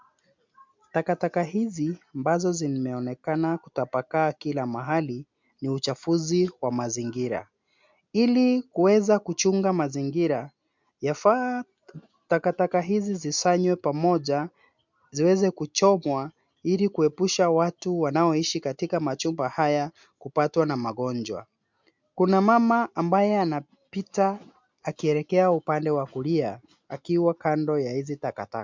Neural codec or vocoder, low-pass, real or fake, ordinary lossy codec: none; 7.2 kHz; real; MP3, 64 kbps